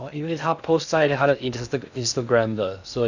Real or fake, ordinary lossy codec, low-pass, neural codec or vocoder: fake; none; 7.2 kHz; codec, 16 kHz in and 24 kHz out, 0.6 kbps, FocalCodec, streaming, 2048 codes